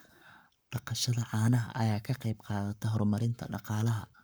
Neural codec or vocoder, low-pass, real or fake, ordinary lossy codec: codec, 44.1 kHz, 7.8 kbps, Pupu-Codec; none; fake; none